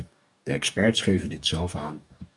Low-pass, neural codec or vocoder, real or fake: 10.8 kHz; codec, 44.1 kHz, 2.6 kbps, DAC; fake